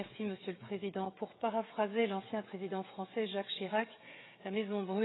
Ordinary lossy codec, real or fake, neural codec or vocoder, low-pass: AAC, 16 kbps; fake; codec, 16 kHz, 16 kbps, FreqCodec, smaller model; 7.2 kHz